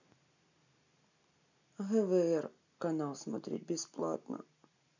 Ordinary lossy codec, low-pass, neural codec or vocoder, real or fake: none; 7.2 kHz; none; real